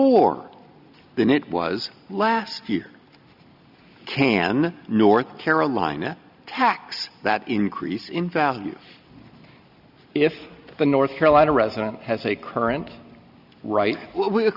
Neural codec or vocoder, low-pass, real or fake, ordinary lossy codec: none; 5.4 kHz; real; Opus, 64 kbps